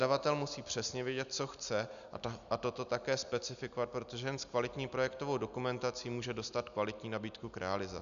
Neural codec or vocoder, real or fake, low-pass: none; real; 7.2 kHz